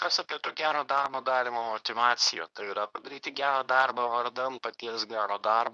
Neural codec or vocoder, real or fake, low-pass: codec, 24 kHz, 0.9 kbps, WavTokenizer, medium speech release version 2; fake; 10.8 kHz